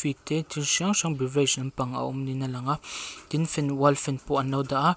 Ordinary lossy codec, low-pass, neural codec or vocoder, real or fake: none; none; none; real